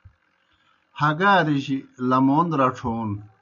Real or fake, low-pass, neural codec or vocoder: real; 7.2 kHz; none